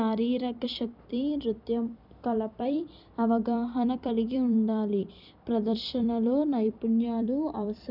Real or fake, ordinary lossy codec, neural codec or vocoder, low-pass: fake; none; vocoder, 44.1 kHz, 128 mel bands every 256 samples, BigVGAN v2; 5.4 kHz